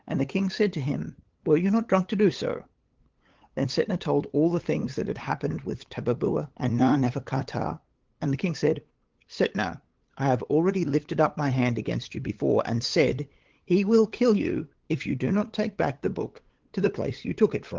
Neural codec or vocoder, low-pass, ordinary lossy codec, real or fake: codec, 16 kHz, 8 kbps, FunCodec, trained on LibriTTS, 25 frames a second; 7.2 kHz; Opus, 16 kbps; fake